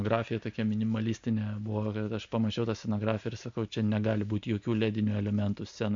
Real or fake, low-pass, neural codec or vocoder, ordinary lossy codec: real; 7.2 kHz; none; MP3, 64 kbps